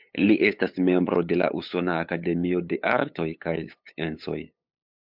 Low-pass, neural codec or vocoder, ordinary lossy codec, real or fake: 5.4 kHz; codec, 16 kHz, 8 kbps, FunCodec, trained on LibriTTS, 25 frames a second; MP3, 48 kbps; fake